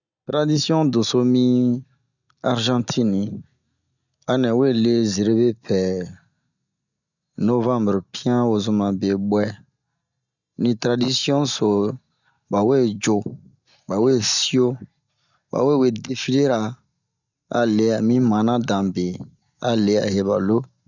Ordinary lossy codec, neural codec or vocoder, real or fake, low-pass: none; none; real; 7.2 kHz